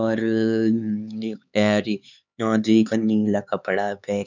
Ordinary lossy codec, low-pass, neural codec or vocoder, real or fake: none; 7.2 kHz; codec, 16 kHz, 4 kbps, X-Codec, HuBERT features, trained on LibriSpeech; fake